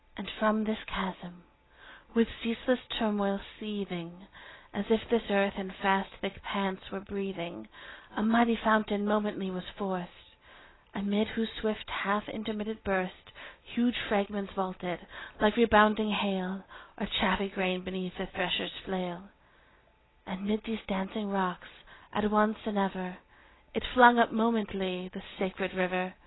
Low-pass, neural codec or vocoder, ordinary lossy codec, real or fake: 7.2 kHz; none; AAC, 16 kbps; real